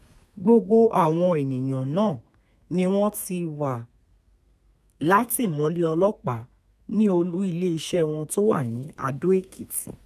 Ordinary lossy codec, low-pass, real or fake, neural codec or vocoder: none; 14.4 kHz; fake; codec, 32 kHz, 1.9 kbps, SNAC